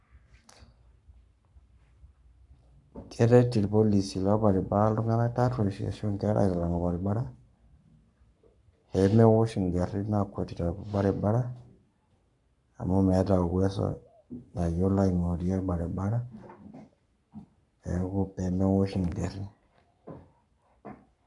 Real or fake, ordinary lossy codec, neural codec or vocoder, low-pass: fake; none; codec, 44.1 kHz, 7.8 kbps, Pupu-Codec; 10.8 kHz